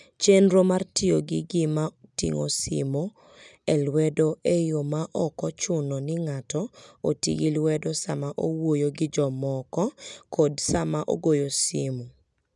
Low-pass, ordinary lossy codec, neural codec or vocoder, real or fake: 10.8 kHz; none; none; real